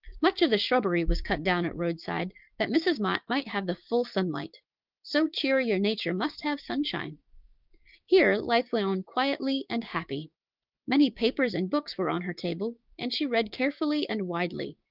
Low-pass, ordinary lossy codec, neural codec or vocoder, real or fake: 5.4 kHz; Opus, 32 kbps; codec, 16 kHz, 6 kbps, DAC; fake